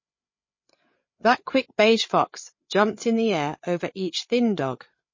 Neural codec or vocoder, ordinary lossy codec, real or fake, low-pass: codec, 16 kHz, 8 kbps, FreqCodec, larger model; MP3, 32 kbps; fake; 7.2 kHz